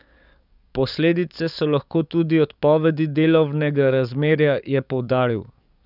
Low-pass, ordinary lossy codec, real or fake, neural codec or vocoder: 5.4 kHz; none; fake; codec, 44.1 kHz, 7.8 kbps, DAC